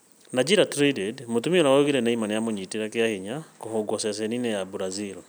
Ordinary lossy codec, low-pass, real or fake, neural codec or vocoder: none; none; real; none